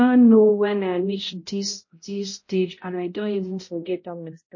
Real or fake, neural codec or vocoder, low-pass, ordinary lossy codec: fake; codec, 16 kHz, 0.5 kbps, X-Codec, HuBERT features, trained on balanced general audio; 7.2 kHz; MP3, 32 kbps